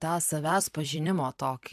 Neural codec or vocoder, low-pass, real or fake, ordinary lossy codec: vocoder, 44.1 kHz, 128 mel bands every 256 samples, BigVGAN v2; 14.4 kHz; fake; MP3, 96 kbps